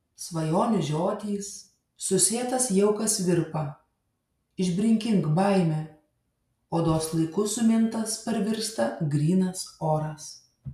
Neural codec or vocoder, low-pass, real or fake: none; 14.4 kHz; real